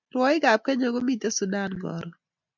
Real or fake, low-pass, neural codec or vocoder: real; 7.2 kHz; none